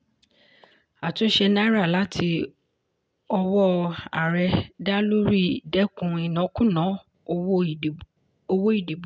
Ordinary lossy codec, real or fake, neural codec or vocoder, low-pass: none; real; none; none